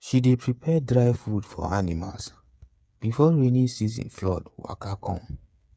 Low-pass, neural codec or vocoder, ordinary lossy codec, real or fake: none; codec, 16 kHz, 8 kbps, FreqCodec, smaller model; none; fake